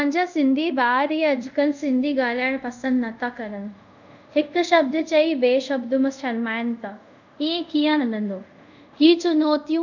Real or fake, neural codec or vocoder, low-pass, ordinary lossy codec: fake; codec, 24 kHz, 0.5 kbps, DualCodec; 7.2 kHz; none